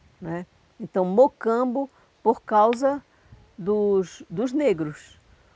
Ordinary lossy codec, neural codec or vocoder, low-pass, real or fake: none; none; none; real